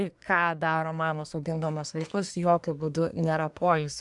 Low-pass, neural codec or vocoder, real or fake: 10.8 kHz; codec, 24 kHz, 1 kbps, SNAC; fake